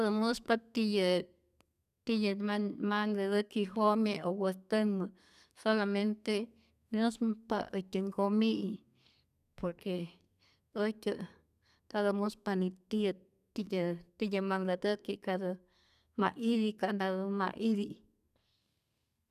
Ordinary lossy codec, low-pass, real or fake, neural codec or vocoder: none; 14.4 kHz; fake; codec, 32 kHz, 1.9 kbps, SNAC